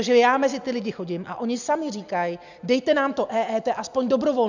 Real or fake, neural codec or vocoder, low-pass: real; none; 7.2 kHz